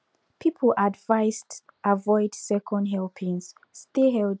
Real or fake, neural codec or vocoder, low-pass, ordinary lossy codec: real; none; none; none